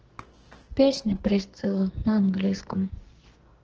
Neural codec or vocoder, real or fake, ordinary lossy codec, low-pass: codec, 44.1 kHz, 2.6 kbps, SNAC; fake; Opus, 16 kbps; 7.2 kHz